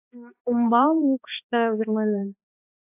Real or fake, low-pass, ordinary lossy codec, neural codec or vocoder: fake; 3.6 kHz; AAC, 32 kbps; codec, 16 kHz, 2 kbps, X-Codec, HuBERT features, trained on balanced general audio